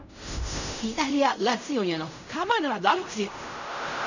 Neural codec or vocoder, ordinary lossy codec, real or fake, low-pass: codec, 16 kHz in and 24 kHz out, 0.4 kbps, LongCat-Audio-Codec, fine tuned four codebook decoder; none; fake; 7.2 kHz